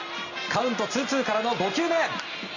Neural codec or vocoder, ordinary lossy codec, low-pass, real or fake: none; none; 7.2 kHz; real